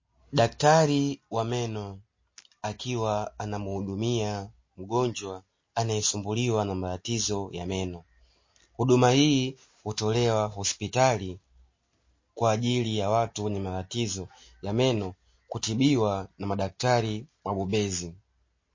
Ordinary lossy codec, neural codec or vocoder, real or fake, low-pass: MP3, 32 kbps; none; real; 7.2 kHz